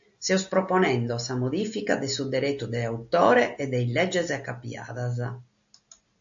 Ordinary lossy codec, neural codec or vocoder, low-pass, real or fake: AAC, 64 kbps; none; 7.2 kHz; real